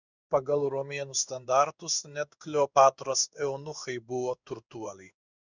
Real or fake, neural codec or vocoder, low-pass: fake; codec, 16 kHz in and 24 kHz out, 1 kbps, XY-Tokenizer; 7.2 kHz